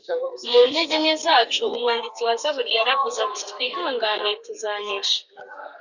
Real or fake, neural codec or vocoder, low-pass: fake; codec, 32 kHz, 1.9 kbps, SNAC; 7.2 kHz